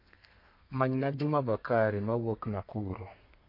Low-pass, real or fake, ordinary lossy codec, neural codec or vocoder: 5.4 kHz; fake; MP3, 32 kbps; codec, 32 kHz, 1.9 kbps, SNAC